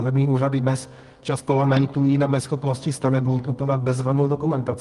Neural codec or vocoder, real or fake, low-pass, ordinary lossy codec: codec, 24 kHz, 0.9 kbps, WavTokenizer, medium music audio release; fake; 10.8 kHz; Opus, 32 kbps